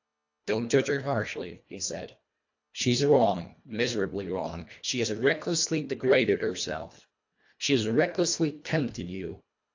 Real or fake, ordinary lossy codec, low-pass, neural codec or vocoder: fake; AAC, 48 kbps; 7.2 kHz; codec, 24 kHz, 1.5 kbps, HILCodec